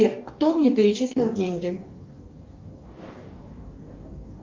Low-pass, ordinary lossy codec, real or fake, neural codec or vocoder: 7.2 kHz; Opus, 32 kbps; fake; codec, 44.1 kHz, 2.6 kbps, DAC